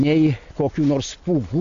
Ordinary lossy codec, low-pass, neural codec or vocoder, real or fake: AAC, 48 kbps; 7.2 kHz; none; real